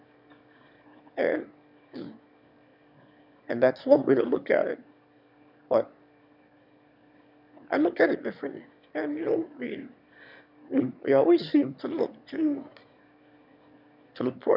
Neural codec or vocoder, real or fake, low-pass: autoencoder, 22.05 kHz, a latent of 192 numbers a frame, VITS, trained on one speaker; fake; 5.4 kHz